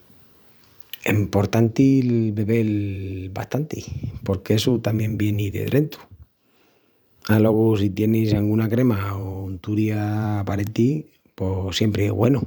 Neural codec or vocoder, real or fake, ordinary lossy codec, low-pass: vocoder, 44.1 kHz, 128 mel bands every 512 samples, BigVGAN v2; fake; none; none